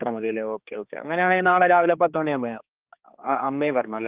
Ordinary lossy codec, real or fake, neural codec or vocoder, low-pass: Opus, 32 kbps; fake; codec, 16 kHz, 2 kbps, X-Codec, HuBERT features, trained on general audio; 3.6 kHz